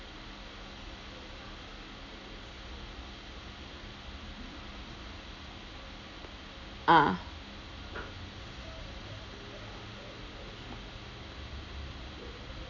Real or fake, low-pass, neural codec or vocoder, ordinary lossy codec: real; 7.2 kHz; none; none